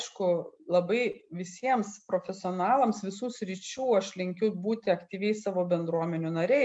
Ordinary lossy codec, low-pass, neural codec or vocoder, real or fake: MP3, 64 kbps; 9.9 kHz; none; real